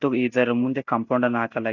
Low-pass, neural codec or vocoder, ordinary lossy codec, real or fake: 7.2 kHz; none; none; real